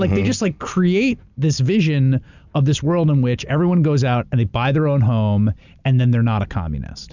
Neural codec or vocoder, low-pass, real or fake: none; 7.2 kHz; real